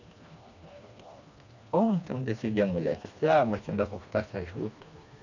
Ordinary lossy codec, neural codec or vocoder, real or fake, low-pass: none; codec, 16 kHz, 2 kbps, FreqCodec, smaller model; fake; 7.2 kHz